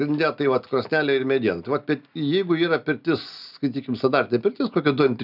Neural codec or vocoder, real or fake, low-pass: none; real; 5.4 kHz